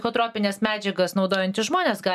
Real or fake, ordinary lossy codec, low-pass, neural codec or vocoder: real; MP3, 96 kbps; 14.4 kHz; none